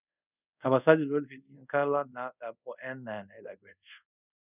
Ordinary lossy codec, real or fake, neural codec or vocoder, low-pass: none; fake; codec, 24 kHz, 0.5 kbps, DualCodec; 3.6 kHz